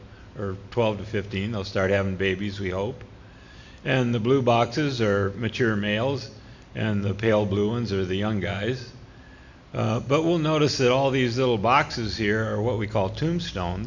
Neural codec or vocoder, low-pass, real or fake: none; 7.2 kHz; real